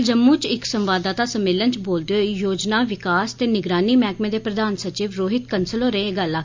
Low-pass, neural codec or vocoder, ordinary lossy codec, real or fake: 7.2 kHz; none; MP3, 64 kbps; real